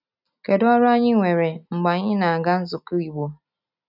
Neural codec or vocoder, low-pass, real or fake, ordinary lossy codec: none; 5.4 kHz; real; none